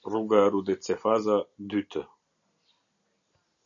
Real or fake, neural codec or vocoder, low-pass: real; none; 7.2 kHz